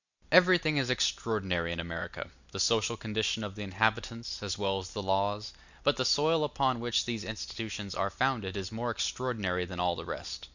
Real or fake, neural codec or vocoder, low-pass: real; none; 7.2 kHz